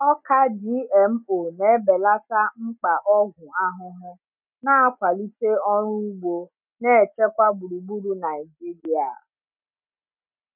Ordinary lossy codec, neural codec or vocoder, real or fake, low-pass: none; none; real; 3.6 kHz